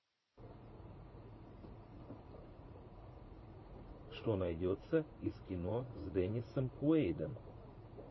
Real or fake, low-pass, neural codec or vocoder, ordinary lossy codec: fake; 7.2 kHz; vocoder, 44.1 kHz, 80 mel bands, Vocos; MP3, 24 kbps